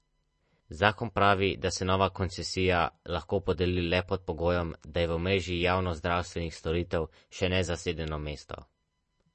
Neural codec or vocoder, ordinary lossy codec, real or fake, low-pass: none; MP3, 32 kbps; real; 9.9 kHz